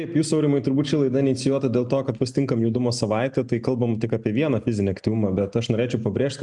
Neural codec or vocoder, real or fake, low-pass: none; real; 10.8 kHz